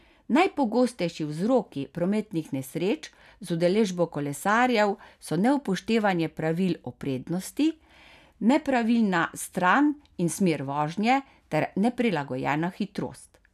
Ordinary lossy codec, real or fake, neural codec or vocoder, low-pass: none; real; none; 14.4 kHz